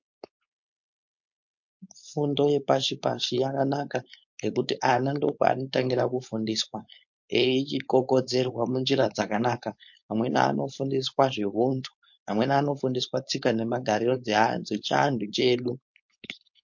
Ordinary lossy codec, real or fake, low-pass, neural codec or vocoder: MP3, 48 kbps; fake; 7.2 kHz; codec, 16 kHz, 4.8 kbps, FACodec